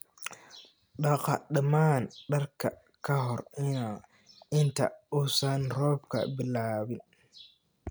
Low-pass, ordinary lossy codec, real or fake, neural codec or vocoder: none; none; real; none